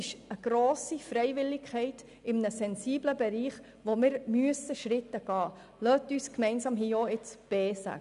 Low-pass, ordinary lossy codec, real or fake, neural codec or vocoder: 10.8 kHz; none; real; none